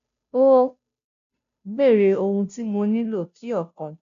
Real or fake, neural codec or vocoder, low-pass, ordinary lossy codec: fake; codec, 16 kHz, 0.5 kbps, FunCodec, trained on Chinese and English, 25 frames a second; 7.2 kHz; none